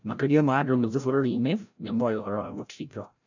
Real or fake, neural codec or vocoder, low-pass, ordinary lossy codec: fake; codec, 16 kHz, 0.5 kbps, FreqCodec, larger model; 7.2 kHz; none